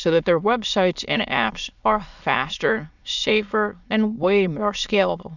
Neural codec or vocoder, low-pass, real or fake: autoencoder, 22.05 kHz, a latent of 192 numbers a frame, VITS, trained on many speakers; 7.2 kHz; fake